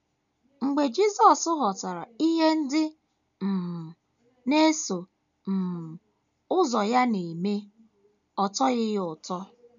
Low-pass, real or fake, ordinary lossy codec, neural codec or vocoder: 7.2 kHz; real; none; none